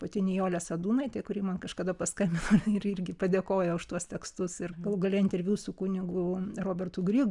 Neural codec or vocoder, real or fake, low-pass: none; real; 10.8 kHz